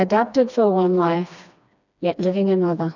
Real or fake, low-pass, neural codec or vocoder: fake; 7.2 kHz; codec, 16 kHz, 2 kbps, FreqCodec, smaller model